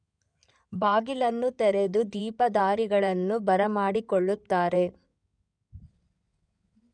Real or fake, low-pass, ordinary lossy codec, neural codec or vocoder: fake; 9.9 kHz; none; codec, 16 kHz in and 24 kHz out, 2.2 kbps, FireRedTTS-2 codec